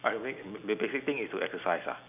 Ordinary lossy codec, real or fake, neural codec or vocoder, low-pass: none; real; none; 3.6 kHz